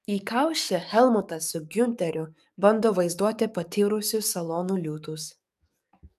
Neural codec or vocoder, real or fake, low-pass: codec, 44.1 kHz, 7.8 kbps, DAC; fake; 14.4 kHz